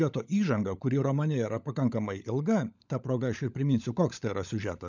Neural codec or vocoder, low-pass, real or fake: codec, 16 kHz, 16 kbps, FunCodec, trained on LibriTTS, 50 frames a second; 7.2 kHz; fake